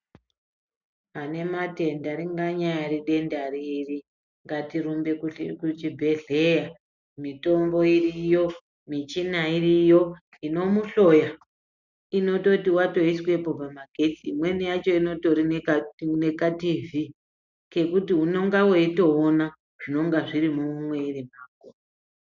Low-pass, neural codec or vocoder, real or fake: 7.2 kHz; none; real